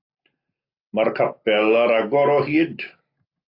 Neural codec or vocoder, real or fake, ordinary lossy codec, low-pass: none; real; AAC, 32 kbps; 5.4 kHz